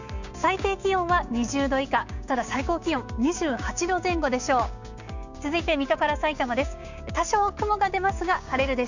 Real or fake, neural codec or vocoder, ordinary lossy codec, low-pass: fake; codec, 16 kHz, 6 kbps, DAC; none; 7.2 kHz